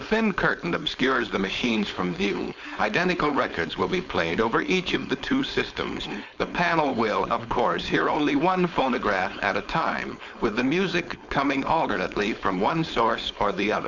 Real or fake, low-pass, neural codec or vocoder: fake; 7.2 kHz; codec, 16 kHz, 4.8 kbps, FACodec